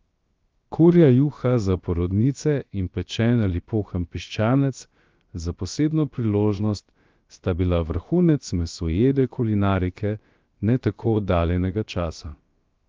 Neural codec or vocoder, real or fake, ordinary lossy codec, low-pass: codec, 16 kHz, 0.7 kbps, FocalCodec; fake; Opus, 24 kbps; 7.2 kHz